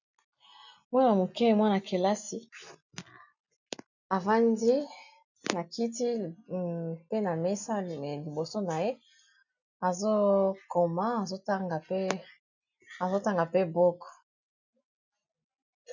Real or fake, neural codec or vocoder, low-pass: real; none; 7.2 kHz